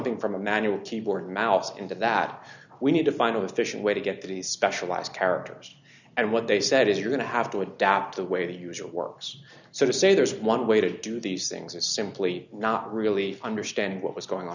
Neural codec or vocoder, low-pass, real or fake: none; 7.2 kHz; real